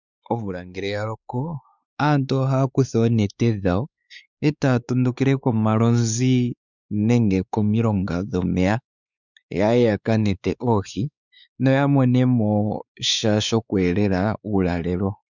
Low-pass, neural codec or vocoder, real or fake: 7.2 kHz; codec, 16 kHz, 4 kbps, X-Codec, WavLM features, trained on Multilingual LibriSpeech; fake